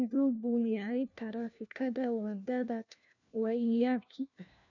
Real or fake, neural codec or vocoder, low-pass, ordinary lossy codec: fake; codec, 16 kHz, 1 kbps, FunCodec, trained on LibriTTS, 50 frames a second; 7.2 kHz; none